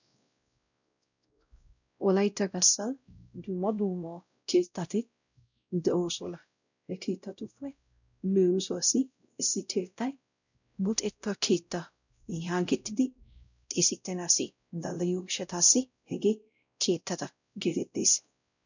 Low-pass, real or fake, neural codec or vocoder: 7.2 kHz; fake; codec, 16 kHz, 0.5 kbps, X-Codec, WavLM features, trained on Multilingual LibriSpeech